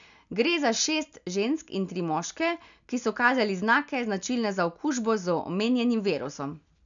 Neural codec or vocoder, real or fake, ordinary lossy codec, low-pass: none; real; none; 7.2 kHz